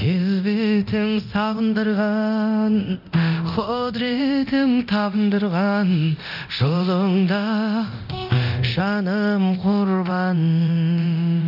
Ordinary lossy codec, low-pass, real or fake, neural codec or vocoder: none; 5.4 kHz; fake; codec, 24 kHz, 0.9 kbps, DualCodec